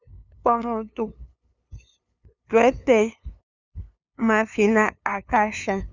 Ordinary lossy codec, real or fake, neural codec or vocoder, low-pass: Opus, 64 kbps; fake; codec, 16 kHz, 2 kbps, FunCodec, trained on LibriTTS, 25 frames a second; 7.2 kHz